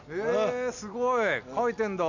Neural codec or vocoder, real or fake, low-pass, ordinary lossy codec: none; real; 7.2 kHz; none